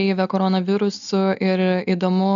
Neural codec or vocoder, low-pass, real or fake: none; 7.2 kHz; real